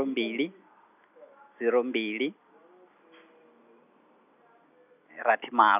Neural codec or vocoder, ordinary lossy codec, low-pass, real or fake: none; none; 3.6 kHz; real